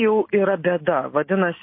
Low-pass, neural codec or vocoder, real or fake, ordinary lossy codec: 5.4 kHz; none; real; MP3, 24 kbps